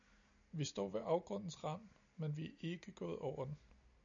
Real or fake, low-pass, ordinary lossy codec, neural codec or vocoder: real; 7.2 kHz; MP3, 96 kbps; none